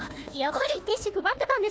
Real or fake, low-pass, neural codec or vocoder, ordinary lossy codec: fake; none; codec, 16 kHz, 1 kbps, FunCodec, trained on Chinese and English, 50 frames a second; none